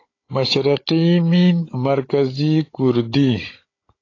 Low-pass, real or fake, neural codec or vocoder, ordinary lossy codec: 7.2 kHz; fake; codec, 16 kHz, 16 kbps, FunCodec, trained on Chinese and English, 50 frames a second; AAC, 32 kbps